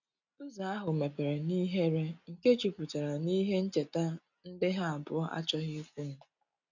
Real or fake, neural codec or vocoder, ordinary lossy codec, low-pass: real; none; none; 7.2 kHz